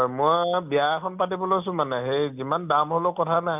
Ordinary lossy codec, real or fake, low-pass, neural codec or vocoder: none; real; 3.6 kHz; none